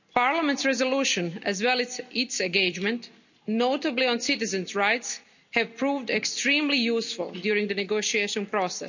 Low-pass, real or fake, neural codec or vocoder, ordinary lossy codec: 7.2 kHz; real; none; none